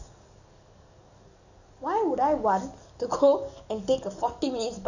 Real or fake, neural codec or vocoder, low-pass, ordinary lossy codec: fake; codec, 44.1 kHz, 7.8 kbps, DAC; 7.2 kHz; none